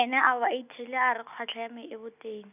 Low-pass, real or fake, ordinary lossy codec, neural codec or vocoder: 3.6 kHz; real; none; none